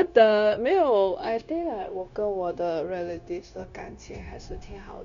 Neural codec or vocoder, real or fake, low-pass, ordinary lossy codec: codec, 16 kHz, 0.9 kbps, LongCat-Audio-Codec; fake; 7.2 kHz; none